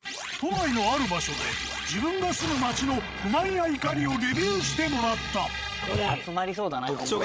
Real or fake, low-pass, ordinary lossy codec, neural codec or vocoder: fake; none; none; codec, 16 kHz, 16 kbps, FreqCodec, larger model